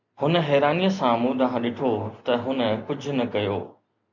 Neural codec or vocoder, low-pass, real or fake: none; 7.2 kHz; real